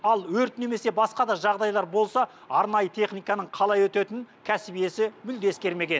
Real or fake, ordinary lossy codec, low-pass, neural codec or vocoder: real; none; none; none